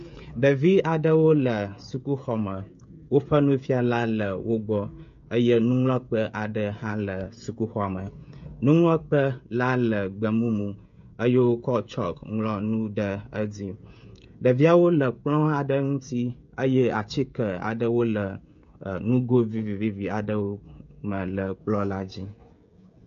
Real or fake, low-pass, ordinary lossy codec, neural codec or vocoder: fake; 7.2 kHz; MP3, 48 kbps; codec, 16 kHz, 16 kbps, FreqCodec, smaller model